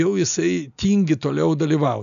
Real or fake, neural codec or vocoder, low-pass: real; none; 7.2 kHz